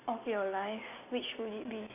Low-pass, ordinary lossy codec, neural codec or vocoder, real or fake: 3.6 kHz; AAC, 24 kbps; none; real